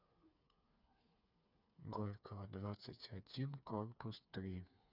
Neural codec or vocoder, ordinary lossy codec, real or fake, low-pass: codec, 16 kHz, 4 kbps, FreqCodec, smaller model; none; fake; 5.4 kHz